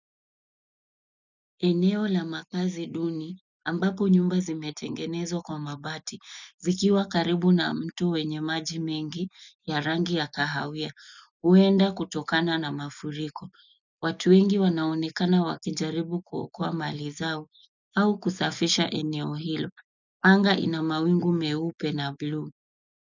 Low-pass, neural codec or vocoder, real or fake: 7.2 kHz; none; real